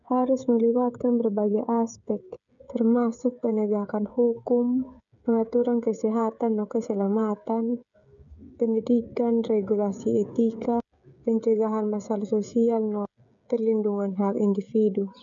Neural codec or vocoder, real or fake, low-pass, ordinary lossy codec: codec, 16 kHz, 16 kbps, FreqCodec, smaller model; fake; 7.2 kHz; none